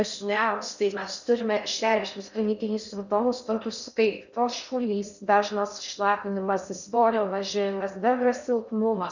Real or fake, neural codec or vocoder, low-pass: fake; codec, 16 kHz in and 24 kHz out, 0.6 kbps, FocalCodec, streaming, 4096 codes; 7.2 kHz